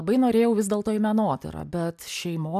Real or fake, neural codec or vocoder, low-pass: real; none; 14.4 kHz